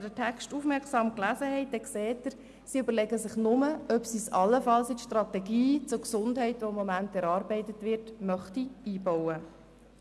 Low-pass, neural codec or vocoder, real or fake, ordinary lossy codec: none; none; real; none